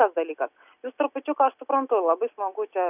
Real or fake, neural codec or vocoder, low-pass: real; none; 3.6 kHz